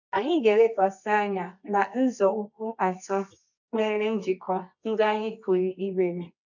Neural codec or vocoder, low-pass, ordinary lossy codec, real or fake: codec, 24 kHz, 0.9 kbps, WavTokenizer, medium music audio release; 7.2 kHz; none; fake